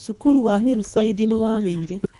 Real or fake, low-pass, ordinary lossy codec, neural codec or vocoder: fake; 10.8 kHz; none; codec, 24 kHz, 1.5 kbps, HILCodec